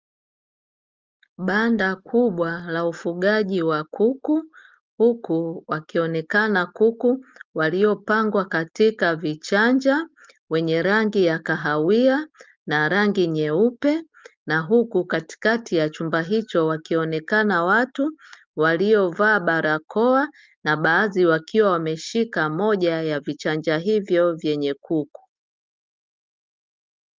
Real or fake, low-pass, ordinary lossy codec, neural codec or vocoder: real; 7.2 kHz; Opus, 24 kbps; none